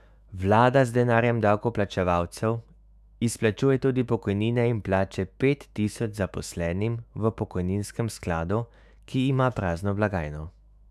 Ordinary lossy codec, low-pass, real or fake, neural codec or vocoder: none; 14.4 kHz; fake; autoencoder, 48 kHz, 128 numbers a frame, DAC-VAE, trained on Japanese speech